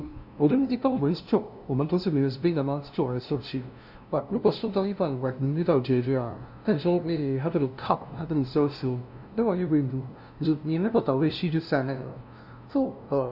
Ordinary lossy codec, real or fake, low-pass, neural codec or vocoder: none; fake; 5.4 kHz; codec, 16 kHz, 0.5 kbps, FunCodec, trained on LibriTTS, 25 frames a second